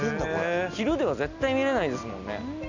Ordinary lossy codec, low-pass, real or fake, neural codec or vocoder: none; 7.2 kHz; real; none